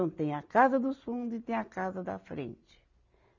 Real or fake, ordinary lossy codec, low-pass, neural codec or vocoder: real; none; 7.2 kHz; none